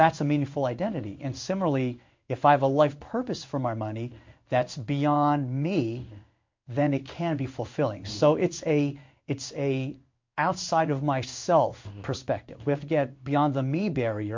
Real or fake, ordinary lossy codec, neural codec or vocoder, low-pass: fake; MP3, 48 kbps; codec, 16 kHz in and 24 kHz out, 1 kbps, XY-Tokenizer; 7.2 kHz